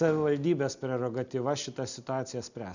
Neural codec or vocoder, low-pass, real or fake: none; 7.2 kHz; real